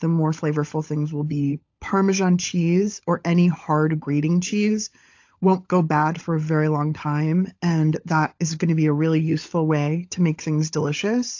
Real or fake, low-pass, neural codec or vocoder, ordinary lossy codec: fake; 7.2 kHz; codec, 16 kHz, 16 kbps, FunCodec, trained on LibriTTS, 50 frames a second; AAC, 48 kbps